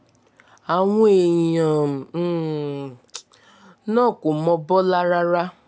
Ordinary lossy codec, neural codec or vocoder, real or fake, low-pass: none; none; real; none